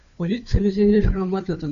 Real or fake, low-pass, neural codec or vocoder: fake; 7.2 kHz; codec, 16 kHz, 2 kbps, FunCodec, trained on Chinese and English, 25 frames a second